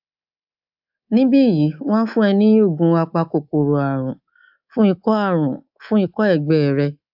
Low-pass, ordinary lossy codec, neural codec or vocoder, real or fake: 5.4 kHz; AAC, 48 kbps; codec, 24 kHz, 3.1 kbps, DualCodec; fake